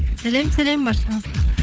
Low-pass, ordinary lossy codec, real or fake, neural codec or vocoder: none; none; fake; codec, 16 kHz, 4 kbps, FunCodec, trained on Chinese and English, 50 frames a second